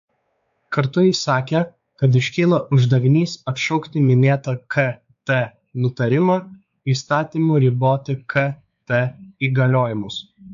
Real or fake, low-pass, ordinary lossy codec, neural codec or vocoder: fake; 7.2 kHz; AAC, 64 kbps; codec, 16 kHz, 4 kbps, X-Codec, WavLM features, trained on Multilingual LibriSpeech